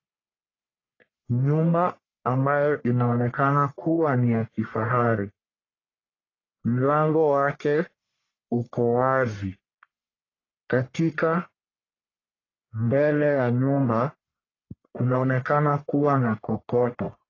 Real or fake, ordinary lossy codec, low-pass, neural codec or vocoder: fake; AAC, 32 kbps; 7.2 kHz; codec, 44.1 kHz, 1.7 kbps, Pupu-Codec